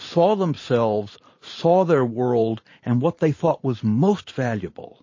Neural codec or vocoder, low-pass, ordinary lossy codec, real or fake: vocoder, 44.1 kHz, 128 mel bands every 512 samples, BigVGAN v2; 7.2 kHz; MP3, 32 kbps; fake